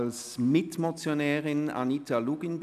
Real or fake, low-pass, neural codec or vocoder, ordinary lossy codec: real; 14.4 kHz; none; none